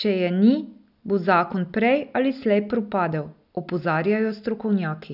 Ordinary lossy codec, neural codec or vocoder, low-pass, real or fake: none; none; 5.4 kHz; real